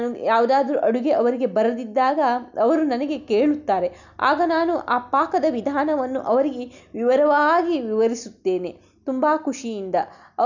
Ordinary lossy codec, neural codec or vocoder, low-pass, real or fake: none; none; 7.2 kHz; real